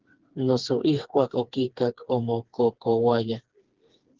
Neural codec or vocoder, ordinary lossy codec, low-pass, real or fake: codec, 16 kHz, 4 kbps, FreqCodec, smaller model; Opus, 16 kbps; 7.2 kHz; fake